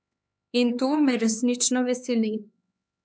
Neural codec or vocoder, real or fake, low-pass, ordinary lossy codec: codec, 16 kHz, 4 kbps, X-Codec, HuBERT features, trained on LibriSpeech; fake; none; none